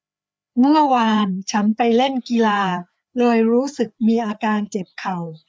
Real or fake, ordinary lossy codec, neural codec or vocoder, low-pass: fake; none; codec, 16 kHz, 4 kbps, FreqCodec, larger model; none